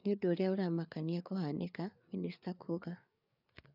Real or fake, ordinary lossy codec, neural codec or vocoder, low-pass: fake; none; codec, 16 kHz, 4 kbps, FreqCodec, larger model; 5.4 kHz